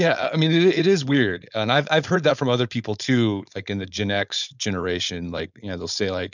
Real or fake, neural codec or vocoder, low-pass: fake; codec, 16 kHz, 4.8 kbps, FACodec; 7.2 kHz